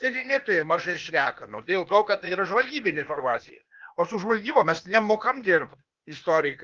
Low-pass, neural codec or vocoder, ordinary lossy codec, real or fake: 7.2 kHz; codec, 16 kHz, 0.8 kbps, ZipCodec; Opus, 32 kbps; fake